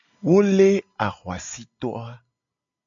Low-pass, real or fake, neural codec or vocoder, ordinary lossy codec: 7.2 kHz; real; none; AAC, 64 kbps